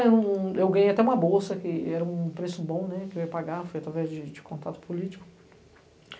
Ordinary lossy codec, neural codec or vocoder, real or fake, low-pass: none; none; real; none